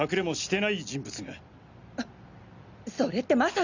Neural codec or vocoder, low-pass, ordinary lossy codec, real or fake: none; 7.2 kHz; Opus, 64 kbps; real